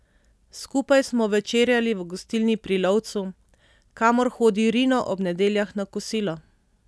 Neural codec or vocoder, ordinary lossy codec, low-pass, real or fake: none; none; none; real